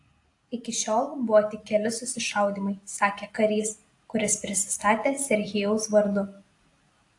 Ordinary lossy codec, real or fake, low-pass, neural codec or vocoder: AAC, 48 kbps; real; 10.8 kHz; none